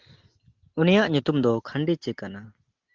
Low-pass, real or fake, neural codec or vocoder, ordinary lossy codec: 7.2 kHz; real; none; Opus, 32 kbps